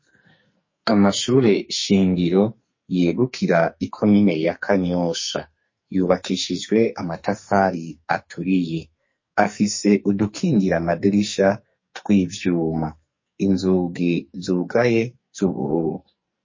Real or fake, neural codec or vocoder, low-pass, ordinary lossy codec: fake; codec, 44.1 kHz, 2.6 kbps, SNAC; 7.2 kHz; MP3, 32 kbps